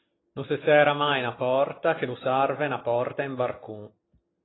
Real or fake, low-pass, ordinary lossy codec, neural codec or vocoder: real; 7.2 kHz; AAC, 16 kbps; none